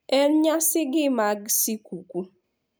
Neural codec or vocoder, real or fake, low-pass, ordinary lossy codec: none; real; none; none